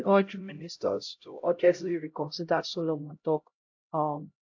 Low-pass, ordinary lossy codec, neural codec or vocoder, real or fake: 7.2 kHz; AAC, 48 kbps; codec, 16 kHz, 0.5 kbps, X-Codec, HuBERT features, trained on LibriSpeech; fake